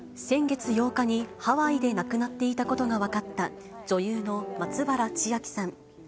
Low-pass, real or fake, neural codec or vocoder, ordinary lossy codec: none; real; none; none